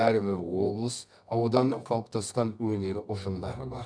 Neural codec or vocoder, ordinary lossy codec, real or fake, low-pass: codec, 24 kHz, 0.9 kbps, WavTokenizer, medium music audio release; none; fake; 9.9 kHz